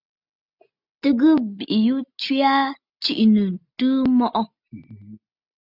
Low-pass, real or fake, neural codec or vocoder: 5.4 kHz; real; none